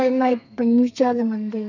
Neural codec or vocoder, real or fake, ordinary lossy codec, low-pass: codec, 32 kHz, 1.9 kbps, SNAC; fake; none; 7.2 kHz